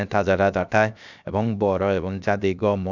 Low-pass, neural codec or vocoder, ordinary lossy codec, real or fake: 7.2 kHz; codec, 16 kHz, 0.7 kbps, FocalCodec; none; fake